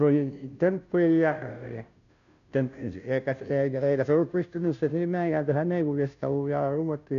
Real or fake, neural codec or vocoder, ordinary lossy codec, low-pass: fake; codec, 16 kHz, 0.5 kbps, FunCodec, trained on Chinese and English, 25 frames a second; none; 7.2 kHz